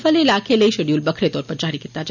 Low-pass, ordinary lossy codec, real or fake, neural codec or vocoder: 7.2 kHz; none; real; none